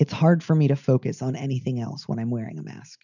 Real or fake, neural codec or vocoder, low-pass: real; none; 7.2 kHz